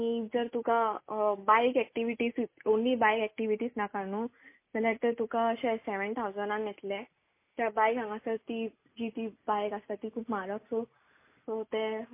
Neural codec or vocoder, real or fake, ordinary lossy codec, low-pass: none; real; MP3, 24 kbps; 3.6 kHz